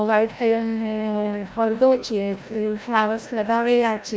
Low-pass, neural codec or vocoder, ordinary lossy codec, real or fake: none; codec, 16 kHz, 0.5 kbps, FreqCodec, larger model; none; fake